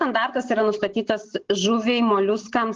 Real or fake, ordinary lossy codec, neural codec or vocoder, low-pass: real; Opus, 16 kbps; none; 7.2 kHz